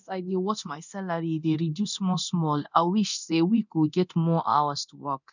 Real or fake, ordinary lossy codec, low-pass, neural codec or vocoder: fake; none; 7.2 kHz; codec, 24 kHz, 0.9 kbps, DualCodec